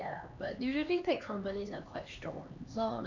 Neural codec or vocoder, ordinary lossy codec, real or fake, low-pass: codec, 16 kHz, 2 kbps, X-Codec, HuBERT features, trained on LibriSpeech; none; fake; 7.2 kHz